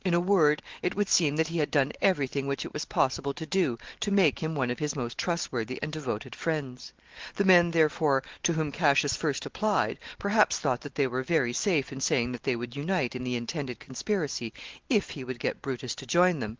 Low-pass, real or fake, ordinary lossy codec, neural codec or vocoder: 7.2 kHz; real; Opus, 16 kbps; none